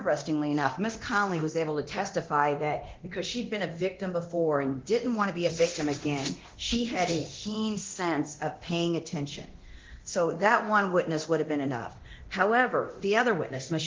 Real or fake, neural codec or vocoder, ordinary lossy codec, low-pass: fake; codec, 24 kHz, 0.9 kbps, DualCodec; Opus, 16 kbps; 7.2 kHz